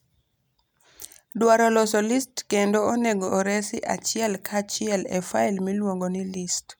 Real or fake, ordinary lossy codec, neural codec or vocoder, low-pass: real; none; none; none